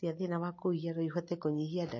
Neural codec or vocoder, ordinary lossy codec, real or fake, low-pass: none; MP3, 32 kbps; real; 7.2 kHz